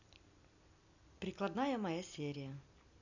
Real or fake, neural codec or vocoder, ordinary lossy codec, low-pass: real; none; none; 7.2 kHz